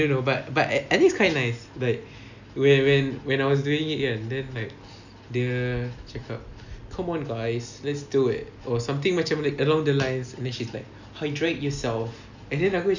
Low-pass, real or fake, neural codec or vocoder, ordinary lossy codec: 7.2 kHz; real; none; none